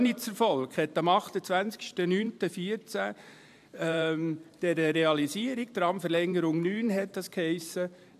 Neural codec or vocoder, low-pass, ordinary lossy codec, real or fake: vocoder, 44.1 kHz, 128 mel bands every 512 samples, BigVGAN v2; 14.4 kHz; AAC, 96 kbps; fake